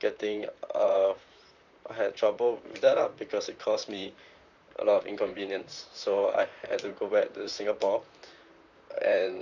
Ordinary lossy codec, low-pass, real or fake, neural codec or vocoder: none; 7.2 kHz; fake; vocoder, 44.1 kHz, 128 mel bands, Pupu-Vocoder